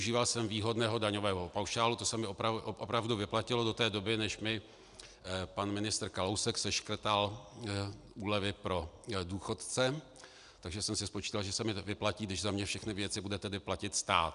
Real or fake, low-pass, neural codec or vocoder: real; 10.8 kHz; none